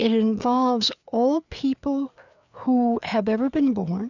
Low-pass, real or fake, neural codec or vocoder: 7.2 kHz; fake; codec, 16 kHz, 4 kbps, FreqCodec, larger model